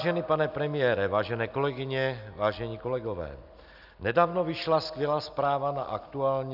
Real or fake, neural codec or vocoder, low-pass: real; none; 5.4 kHz